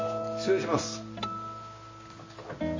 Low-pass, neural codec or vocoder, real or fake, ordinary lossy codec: 7.2 kHz; none; real; MP3, 32 kbps